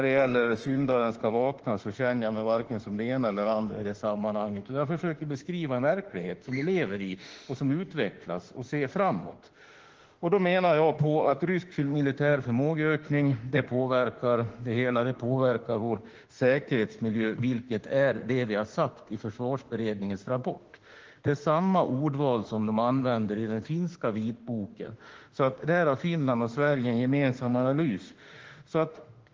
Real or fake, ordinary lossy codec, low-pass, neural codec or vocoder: fake; Opus, 16 kbps; 7.2 kHz; autoencoder, 48 kHz, 32 numbers a frame, DAC-VAE, trained on Japanese speech